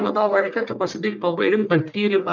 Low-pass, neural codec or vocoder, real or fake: 7.2 kHz; codec, 44.1 kHz, 1.7 kbps, Pupu-Codec; fake